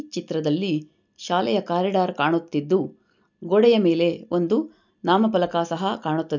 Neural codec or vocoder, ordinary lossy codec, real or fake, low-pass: none; none; real; 7.2 kHz